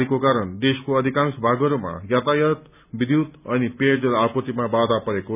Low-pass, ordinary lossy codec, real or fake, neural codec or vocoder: 3.6 kHz; none; real; none